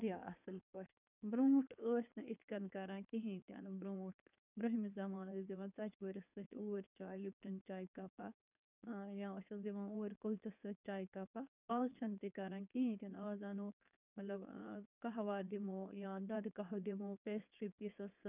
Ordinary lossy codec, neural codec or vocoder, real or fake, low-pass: none; codec, 16 kHz in and 24 kHz out, 2.2 kbps, FireRedTTS-2 codec; fake; 3.6 kHz